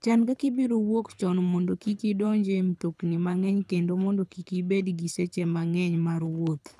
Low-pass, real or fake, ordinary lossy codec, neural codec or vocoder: none; fake; none; codec, 24 kHz, 6 kbps, HILCodec